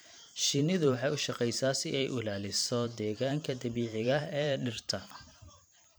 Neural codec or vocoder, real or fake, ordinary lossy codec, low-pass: vocoder, 44.1 kHz, 128 mel bands every 512 samples, BigVGAN v2; fake; none; none